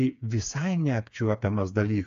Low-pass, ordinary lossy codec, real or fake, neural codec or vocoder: 7.2 kHz; AAC, 64 kbps; fake; codec, 16 kHz, 4 kbps, FreqCodec, smaller model